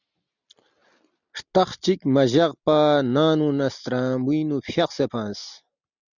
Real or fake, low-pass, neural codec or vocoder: real; 7.2 kHz; none